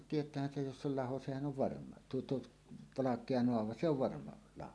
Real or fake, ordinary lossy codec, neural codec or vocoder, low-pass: real; none; none; none